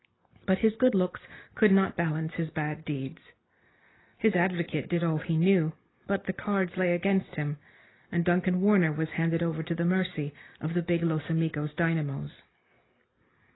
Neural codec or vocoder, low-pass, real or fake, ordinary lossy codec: none; 7.2 kHz; real; AAC, 16 kbps